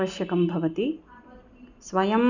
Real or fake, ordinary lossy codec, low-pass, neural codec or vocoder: real; none; 7.2 kHz; none